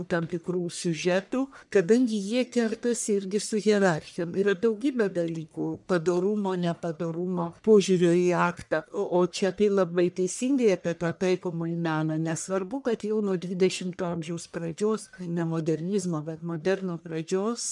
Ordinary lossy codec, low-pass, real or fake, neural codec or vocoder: MP3, 96 kbps; 10.8 kHz; fake; codec, 44.1 kHz, 1.7 kbps, Pupu-Codec